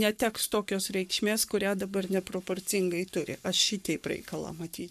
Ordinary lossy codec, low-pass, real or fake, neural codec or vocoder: MP3, 96 kbps; 14.4 kHz; fake; codec, 44.1 kHz, 7.8 kbps, Pupu-Codec